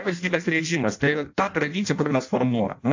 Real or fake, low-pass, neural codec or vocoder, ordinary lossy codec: fake; 7.2 kHz; codec, 16 kHz in and 24 kHz out, 0.6 kbps, FireRedTTS-2 codec; AAC, 48 kbps